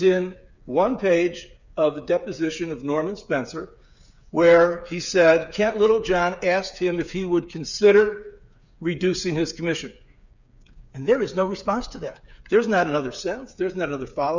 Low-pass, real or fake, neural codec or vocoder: 7.2 kHz; fake; codec, 16 kHz, 8 kbps, FreqCodec, smaller model